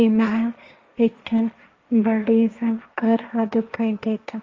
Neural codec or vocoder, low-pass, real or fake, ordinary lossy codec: codec, 16 kHz, 1.1 kbps, Voila-Tokenizer; 7.2 kHz; fake; Opus, 32 kbps